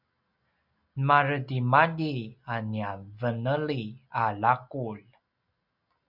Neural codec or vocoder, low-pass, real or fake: none; 5.4 kHz; real